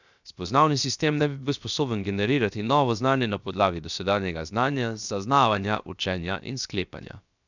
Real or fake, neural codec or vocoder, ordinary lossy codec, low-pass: fake; codec, 16 kHz, 0.7 kbps, FocalCodec; none; 7.2 kHz